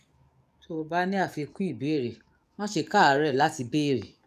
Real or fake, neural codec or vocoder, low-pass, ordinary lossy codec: fake; codec, 44.1 kHz, 7.8 kbps, DAC; 14.4 kHz; none